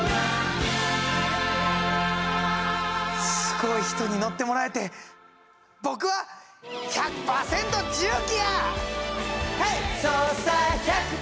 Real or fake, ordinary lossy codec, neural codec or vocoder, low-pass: real; none; none; none